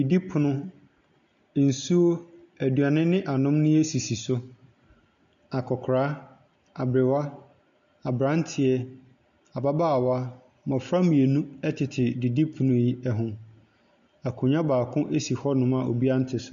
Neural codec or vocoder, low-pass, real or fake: none; 7.2 kHz; real